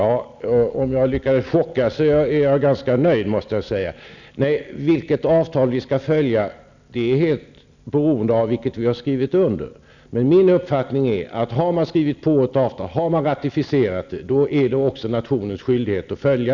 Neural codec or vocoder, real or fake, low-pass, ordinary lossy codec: none; real; 7.2 kHz; none